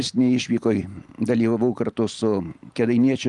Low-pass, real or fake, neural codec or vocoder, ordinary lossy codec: 10.8 kHz; real; none; Opus, 32 kbps